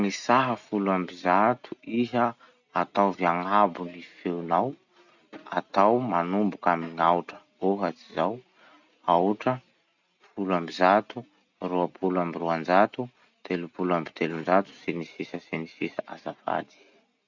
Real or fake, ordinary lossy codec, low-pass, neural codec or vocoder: real; none; 7.2 kHz; none